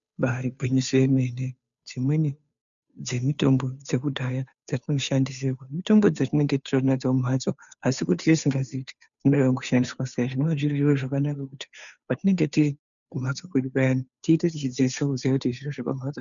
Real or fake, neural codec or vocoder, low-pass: fake; codec, 16 kHz, 2 kbps, FunCodec, trained on Chinese and English, 25 frames a second; 7.2 kHz